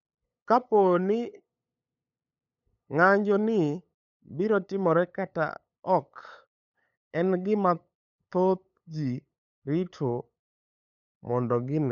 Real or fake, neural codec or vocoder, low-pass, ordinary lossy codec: fake; codec, 16 kHz, 8 kbps, FunCodec, trained on LibriTTS, 25 frames a second; 7.2 kHz; none